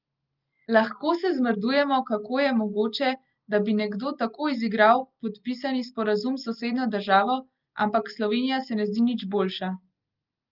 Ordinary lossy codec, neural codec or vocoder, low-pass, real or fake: Opus, 32 kbps; none; 5.4 kHz; real